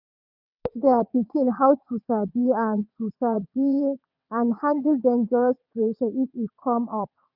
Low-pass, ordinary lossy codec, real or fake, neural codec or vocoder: 5.4 kHz; none; fake; vocoder, 22.05 kHz, 80 mel bands, WaveNeXt